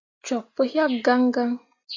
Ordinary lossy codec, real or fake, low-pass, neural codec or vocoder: AAC, 32 kbps; real; 7.2 kHz; none